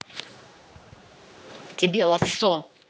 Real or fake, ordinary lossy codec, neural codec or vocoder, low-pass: fake; none; codec, 16 kHz, 2 kbps, X-Codec, HuBERT features, trained on general audio; none